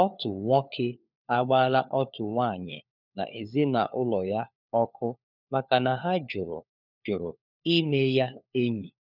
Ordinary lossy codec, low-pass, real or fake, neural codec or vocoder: none; 5.4 kHz; fake; codec, 16 kHz, 4 kbps, FunCodec, trained on LibriTTS, 50 frames a second